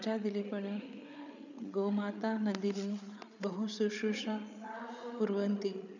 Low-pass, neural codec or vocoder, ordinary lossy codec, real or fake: 7.2 kHz; codec, 16 kHz, 8 kbps, FreqCodec, larger model; none; fake